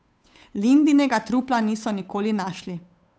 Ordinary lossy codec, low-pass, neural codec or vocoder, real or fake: none; none; codec, 16 kHz, 8 kbps, FunCodec, trained on Chinese and English, 25 frames a second; fake